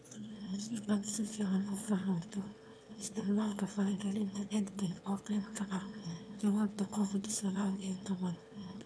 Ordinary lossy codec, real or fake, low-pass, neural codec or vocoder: none; fake; none; autoencoder, 22.05 kHz, a latent of 192 numbers a frame, VITS, trained on one speaker